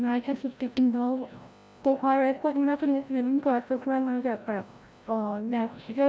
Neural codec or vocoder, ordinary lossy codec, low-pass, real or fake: codec, 16 kHz, 0.5 kbps, FreqCodec, larger model; none; none; fake